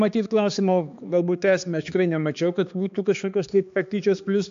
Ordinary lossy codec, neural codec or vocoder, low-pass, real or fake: AAC, 64 kbps; codec, 16 kHz, 2 kbps, X-Codec, HuBERT features, trained on balanced general audio; 7.2 kHz; fake